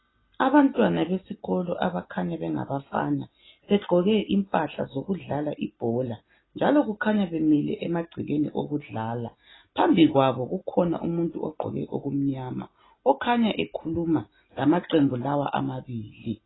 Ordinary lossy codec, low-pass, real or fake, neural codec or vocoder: AAC, 16 kbps; 7.2 kHz; real; none